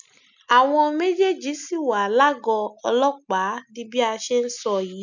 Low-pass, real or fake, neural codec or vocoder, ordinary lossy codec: 7.2 kHz; real; none; none